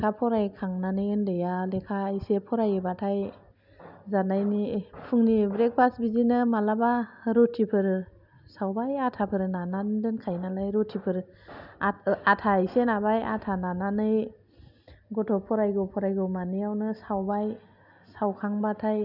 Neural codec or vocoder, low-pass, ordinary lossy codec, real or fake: none; 5.4 kHz; none; real